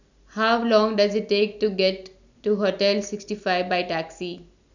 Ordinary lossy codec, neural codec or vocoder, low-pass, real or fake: none; none; 7.2 kHz; real